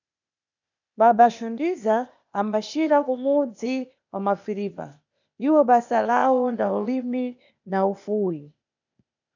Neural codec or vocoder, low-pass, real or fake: codec, 16 kHz, 0.8 kbps, ZipCodec; 7.2 kHz; fake